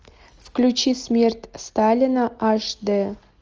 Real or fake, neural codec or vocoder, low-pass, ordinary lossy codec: real; none; 7.2 kHz; Opus, 24 kbps